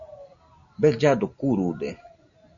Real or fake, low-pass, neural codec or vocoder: real; 7.2 kHz; none